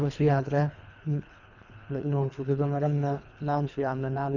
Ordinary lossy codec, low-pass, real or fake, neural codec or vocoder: none; 7.2 kHz; fake; codec, 24 kHz, 3 kbps, HILCodec